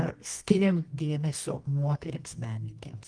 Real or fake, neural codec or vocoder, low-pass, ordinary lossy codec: fake; codec, 24 kHz, 0.9 kbps, WavTokenizer, medium music audio release; 9.9 kHz; Opus, 24 kbps